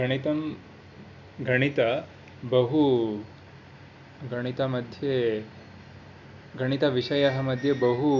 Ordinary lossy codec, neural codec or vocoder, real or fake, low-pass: none; none; real; 7.2 kHz